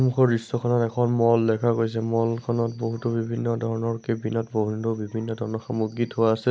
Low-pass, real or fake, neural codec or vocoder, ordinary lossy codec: none; real; none; none